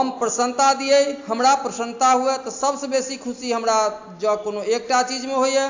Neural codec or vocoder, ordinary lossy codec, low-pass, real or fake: none; AAC, 48 kbps; 7.2 kHz; real